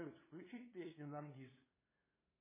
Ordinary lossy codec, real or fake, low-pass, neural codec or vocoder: MP3, 16 kbps; fake; 3.6 kHz; codec, 16 kHz, 2 kbps, FunCodec, trained on LibriTTS, 25 frames a second